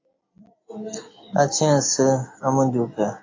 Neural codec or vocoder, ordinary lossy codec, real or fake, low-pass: none; AAC, 32 kbps; real; 7.2 kHz